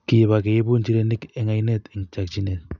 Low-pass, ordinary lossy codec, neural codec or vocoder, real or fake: 7.2 kHz; none; none; real